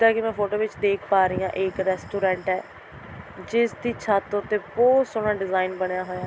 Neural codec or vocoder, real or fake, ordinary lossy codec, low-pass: none; real; none; none